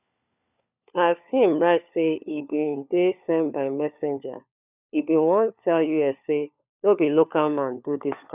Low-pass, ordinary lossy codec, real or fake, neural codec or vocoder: 3.6 kHz; none; fake; codec, 16 kHz, 4 kbps, FunCodec, trained on LibriTTS, 50 frames a second